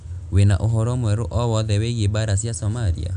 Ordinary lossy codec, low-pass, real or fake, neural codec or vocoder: none; 9.9 kHz; real; none